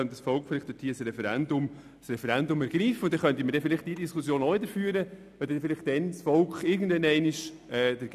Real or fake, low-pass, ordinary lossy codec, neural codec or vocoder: real; 14.4 kHz; none; none